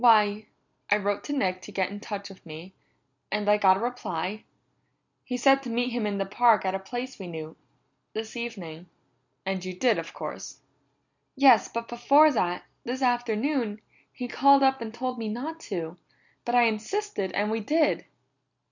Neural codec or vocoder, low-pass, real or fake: none; 7.2 kHz; real